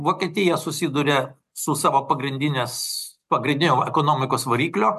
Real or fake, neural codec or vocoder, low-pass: real; none; 14.4 kHz